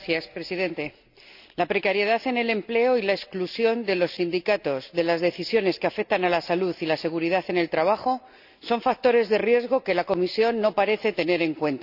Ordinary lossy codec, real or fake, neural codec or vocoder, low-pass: none; real; none; 5.4 kHz